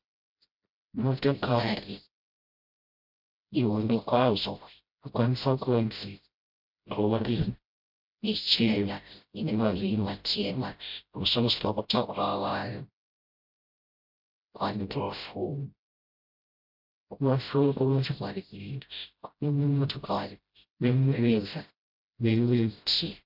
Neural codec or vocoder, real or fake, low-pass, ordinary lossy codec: codec, 16 kHz, 0.5 kbps, FreqCodec, smaller model; fake; 5.4 kHz; MP3, 48 kbps